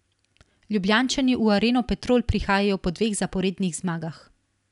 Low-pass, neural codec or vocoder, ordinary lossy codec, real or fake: 10.8 kHz; none; none; real